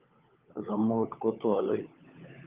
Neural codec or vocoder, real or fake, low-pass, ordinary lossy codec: codec, 16 kHz, 16 kbps, FunCodec, trained on LibriTTS, 50 frames a second; fake; 3.6 kHz; Opus, 32 kbps